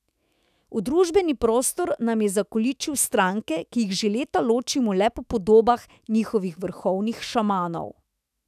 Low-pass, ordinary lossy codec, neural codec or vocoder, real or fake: 14.4 kHz; none; autoencoder, 48 kHz, 128 numbers a frame, DAC-VAE, trained on Japanese speech; fake